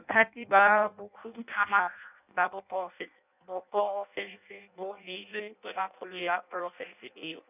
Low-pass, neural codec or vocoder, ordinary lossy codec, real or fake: 3.6 kHz; codec, 16 kHz in and 24 kHz out, 0.6 kbps, FireRedTTS-2 codec; none; fake